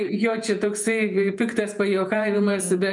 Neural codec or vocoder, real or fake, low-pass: vocoder, 48 kHz, 128 mel bands, Vocos; fake; 10.8 kHz